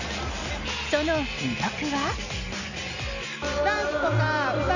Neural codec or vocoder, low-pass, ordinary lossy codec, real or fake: none; 7.2 kHz; none; real